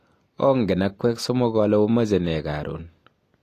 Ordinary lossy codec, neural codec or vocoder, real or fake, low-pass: AAC, 48 kbps; none; real; 14.4 kHz